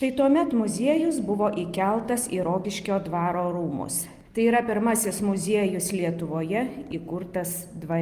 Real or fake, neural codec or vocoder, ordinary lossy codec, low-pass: real; none; Opus, 32 kbps; 14.4 kHz